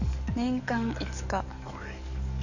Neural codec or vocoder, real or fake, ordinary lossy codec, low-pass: codec, 44.1 kHz, 7.8 kbps, DAC; fake; none; 7.2 kHz